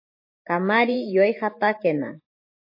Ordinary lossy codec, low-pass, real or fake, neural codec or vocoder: MP3, 24 kbps; 5.4 kHz; fake; vocoder, 44.1 kHz, 128 mel bands every 512 samples, BigVGAN v2